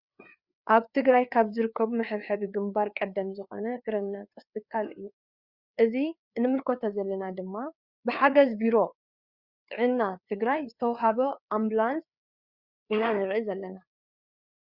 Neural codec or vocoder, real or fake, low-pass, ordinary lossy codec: codec, 16 kHz, 4 kbps, FreqCodec, larger model; fake; 5.4 kHz; Opus, 64 kbps